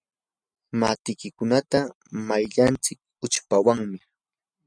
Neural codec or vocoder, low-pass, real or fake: none; 9.9 kHz; real